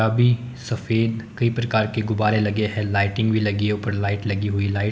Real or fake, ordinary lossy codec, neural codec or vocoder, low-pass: real; none; none; none